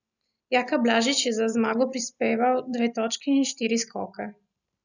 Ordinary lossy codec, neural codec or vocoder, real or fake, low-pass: none; none; real; 7.2 kHz